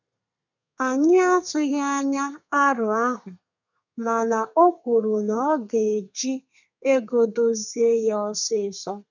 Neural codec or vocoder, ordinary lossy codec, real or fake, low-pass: codec, 32 kHz, 1.9 kbps, SNAC; none; fake; 7.2 kHz